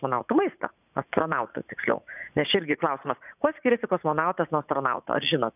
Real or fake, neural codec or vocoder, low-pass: fake; vocoder, 44.1 kHz, 80 mel bands, Vocos; 3.6 kHz